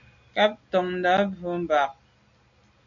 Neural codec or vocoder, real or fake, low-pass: none; real; 7.2 kHz